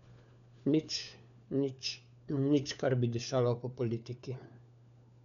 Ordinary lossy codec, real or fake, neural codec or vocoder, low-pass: none; fake; codec, 16 kHz, 4 kbps, FunCodec, trained on LibriTTS, 50 frames a second; 7.2 kHz